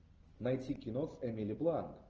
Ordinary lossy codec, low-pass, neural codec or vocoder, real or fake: Opus, 24 kbps; 7.2 kHz; none; real